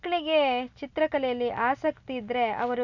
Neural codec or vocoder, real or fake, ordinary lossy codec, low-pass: none; real; none; 7.2 kHz